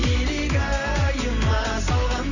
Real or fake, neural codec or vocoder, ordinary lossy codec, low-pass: real; none; none; 7.2 kHz